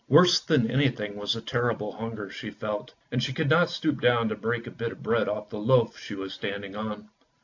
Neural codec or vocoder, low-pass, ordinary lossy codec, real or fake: none; 7.2 kHz; AAC, 48 kbps; real